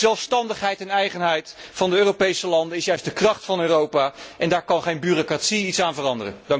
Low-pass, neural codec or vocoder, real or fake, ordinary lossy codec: none; none; real; none